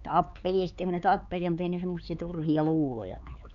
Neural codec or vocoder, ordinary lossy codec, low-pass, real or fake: codec, 16 kHz, 4 kbps, X-Codec, HuBERT features, trained on LibriSpeech; none; 7.2 kHz; fake